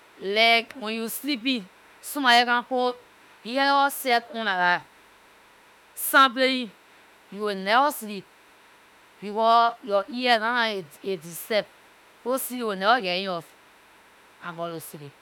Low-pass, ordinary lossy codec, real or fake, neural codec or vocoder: none; none; fake; autoencoder, 48 kHz, 32 numbers a frame, DAC-VAE, trained on Japanese speech